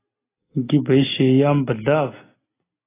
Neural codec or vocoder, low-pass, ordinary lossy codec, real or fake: none; 3.6 kHz; AAC, 16 kbps; real